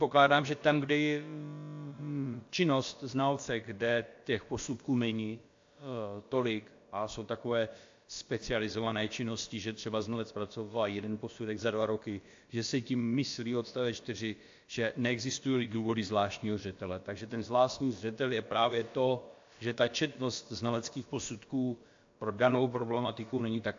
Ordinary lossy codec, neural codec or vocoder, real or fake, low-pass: AAC, 48 kbps; codec, 16 kHz, about 1 kbps, DyCAST, with the encoder's durations; fake; 7.2 kHz